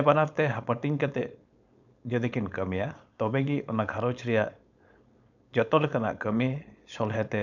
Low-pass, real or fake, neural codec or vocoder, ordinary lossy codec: 7.2 kHz; fake; codec, 16 kHz, 4.8 kbps, FACodec; none